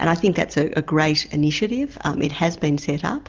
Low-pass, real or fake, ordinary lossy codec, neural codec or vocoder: 7.2 kHz; real; Opus, 24 kbps; none